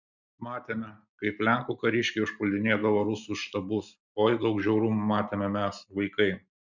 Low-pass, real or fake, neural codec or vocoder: 7.2 kHz; real; none